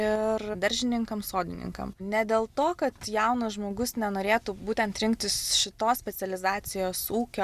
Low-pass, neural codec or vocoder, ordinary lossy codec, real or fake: 14.4 kHz; none; Opus, 64 kbps; real